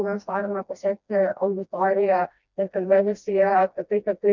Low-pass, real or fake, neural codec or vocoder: 7.2 kHz; fake; codec, 16 kHz, 1 kbps, FreqCodec, smaller model